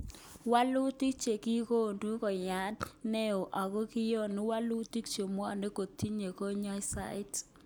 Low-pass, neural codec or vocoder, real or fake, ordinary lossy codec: none; none; real; none